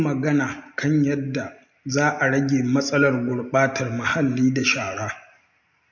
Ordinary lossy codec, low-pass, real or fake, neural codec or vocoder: MP3, 48 kbps; 7.2 kHz; real; none